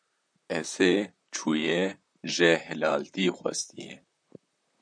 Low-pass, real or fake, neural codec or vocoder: 9.9 kHz; fake; vocoder, 44.1 kHz, 128 mel bands, Pupu-Vocoder